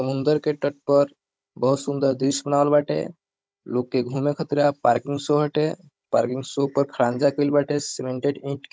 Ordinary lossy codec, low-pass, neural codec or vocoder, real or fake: none; none; codec, 16 kHz, 16 kbps, FunCodec, trained on Chinese and English, 50 frames a second; fake